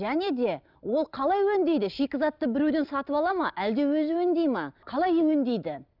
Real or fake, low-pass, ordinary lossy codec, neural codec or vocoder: real; 5.4 kHz; none; none